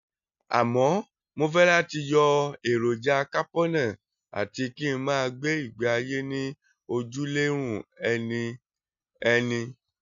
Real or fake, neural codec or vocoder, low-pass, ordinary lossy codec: real; none; 7.2 kHz; none